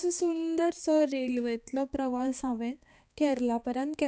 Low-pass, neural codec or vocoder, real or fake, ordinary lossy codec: none; codec, 16 kHz, 2 kbps, X-Codec, HuBERT features, trained on balanced general audio; fake; none